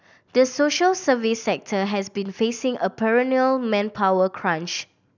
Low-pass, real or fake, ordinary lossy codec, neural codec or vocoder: 7.2 kHz; real; none; none